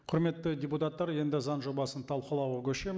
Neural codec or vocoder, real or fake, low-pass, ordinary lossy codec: none; real; none; none